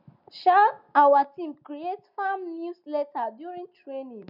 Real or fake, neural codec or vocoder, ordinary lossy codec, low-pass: real; none; none; 5.4 kHz